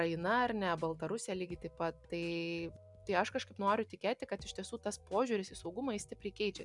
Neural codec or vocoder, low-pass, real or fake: none; 10.8 kHz; real